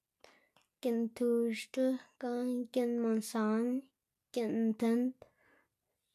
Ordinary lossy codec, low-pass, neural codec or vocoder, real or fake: none; 14.4 kHz; none; real